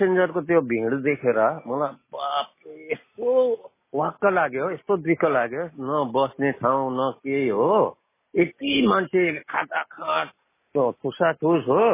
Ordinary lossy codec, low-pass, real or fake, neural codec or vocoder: MP3, 16 kbps; 3.6 kHz; real; none